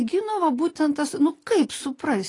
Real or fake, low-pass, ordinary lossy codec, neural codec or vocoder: real; 10.8 kHz; AAC, 32 kbps; none